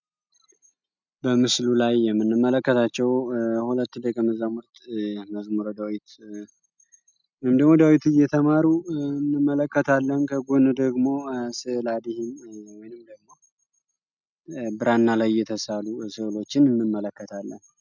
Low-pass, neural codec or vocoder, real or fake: 7.2 kHz; none; real